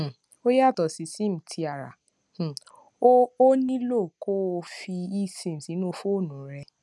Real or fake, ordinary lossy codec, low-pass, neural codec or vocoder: real; none; none; none